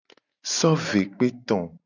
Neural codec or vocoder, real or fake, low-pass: none; real; 7.2 kHz